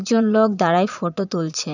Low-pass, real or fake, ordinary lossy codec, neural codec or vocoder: 7.2 kHz; fake; none; vocoder, 22.05 kHz, 80 mel bands, Vocos